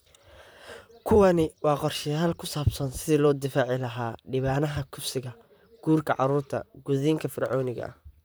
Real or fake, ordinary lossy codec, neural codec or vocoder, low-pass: fake; none; vocoder, 44.1 kHz, 128 mel bands, Pupu-Vocoder; none